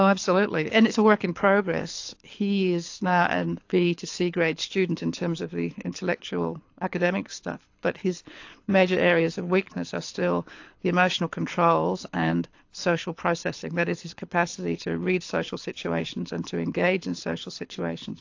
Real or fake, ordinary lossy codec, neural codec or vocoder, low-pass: fake; AAC, 48 kbps; codec, 24 kHz, 6 kbps, HILCodec; 7.2 kHz